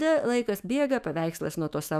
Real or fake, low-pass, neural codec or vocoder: fake; 14.4 kHz; autoencoder, 48 kHz, 128 numbers a frame, DAC-VAE, trained on Japanese speech